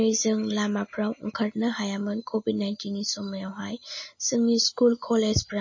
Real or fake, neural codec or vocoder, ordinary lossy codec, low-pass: real; none; MP3, 32 kbps; 7.2 kHz